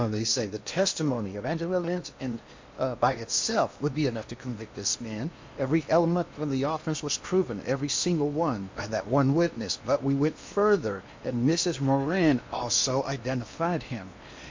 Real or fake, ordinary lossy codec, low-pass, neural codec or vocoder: fake; MP3, 48 kbps; 7.2 kHz; codec, 16 kHz in and 24 kHz out, 0.8 kbps, FocalCodec, streaming, 65536 codes